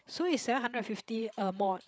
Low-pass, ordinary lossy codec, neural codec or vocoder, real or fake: none; none; codec, 16 kHz, 8 kbps, FreqCodec, larger model; fake